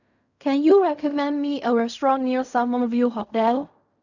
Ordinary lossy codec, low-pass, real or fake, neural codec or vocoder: none; 7.2 kHz; fake; codec, 16 kHz in and 24 kHz out, 0.4 kbps, LongCat-Audio-Codec, fine tuned four codebook decoder